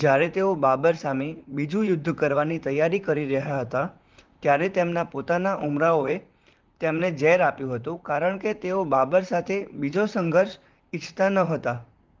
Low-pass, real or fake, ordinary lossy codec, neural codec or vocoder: 7.2 kHz; fake; Opus, 24 kbps; vocoder, 44.1 kHz, 128 mel bands, Pupu-Vocoder